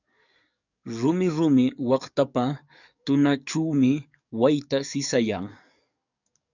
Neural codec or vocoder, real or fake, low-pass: codec, 44.1 kHz, 7.8 kbps, DAC; fake; 7.2 kHz